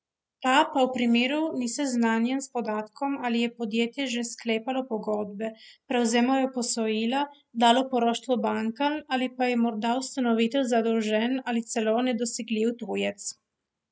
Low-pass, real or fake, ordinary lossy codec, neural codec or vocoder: none; real; none; none